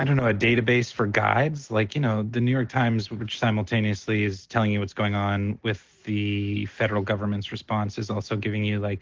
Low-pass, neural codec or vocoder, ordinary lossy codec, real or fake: 7.2 kHz; none; Opus, 16 kbps; real